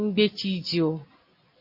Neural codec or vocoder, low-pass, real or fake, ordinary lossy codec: none; 5.4 kHz; real; MP3, 32 kbps